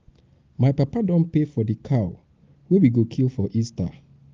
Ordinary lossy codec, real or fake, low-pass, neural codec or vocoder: Opus, 32 kbps; real; 7.2 kHz; none